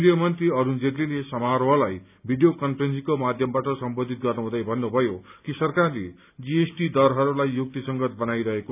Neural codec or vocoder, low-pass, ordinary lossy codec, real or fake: none; 3.6 kHz; none; real